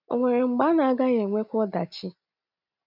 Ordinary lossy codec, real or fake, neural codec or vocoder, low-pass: none; real; none; 5.4 kHz